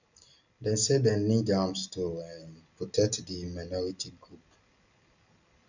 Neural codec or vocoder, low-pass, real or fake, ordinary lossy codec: none; 7.2 kHz; real; none